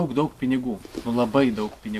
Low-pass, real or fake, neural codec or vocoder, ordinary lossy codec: 14.4 kHz; real; none; AAC, 64 kbps